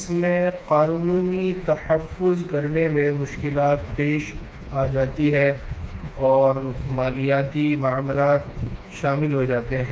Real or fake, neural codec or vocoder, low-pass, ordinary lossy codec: fake; codec, 16 kHz, 2 kbps, FreqCodec, smaller model; none; none